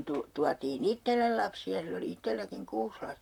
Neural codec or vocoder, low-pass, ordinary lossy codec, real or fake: vocoder, 44.1 kHz, 128 mel bands, Pupu-Vocoder; 19.8 kHz; none; fake